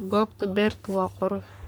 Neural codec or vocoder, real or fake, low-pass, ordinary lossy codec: codec, 44.1 kHz, 3.4 kbps, Pupu-Codec; fake; none; none